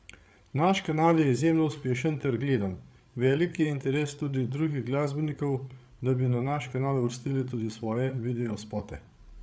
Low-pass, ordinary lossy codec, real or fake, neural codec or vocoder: none; none; fake; codec, 16 kHz, 8 kbps, FreqCodec, larger model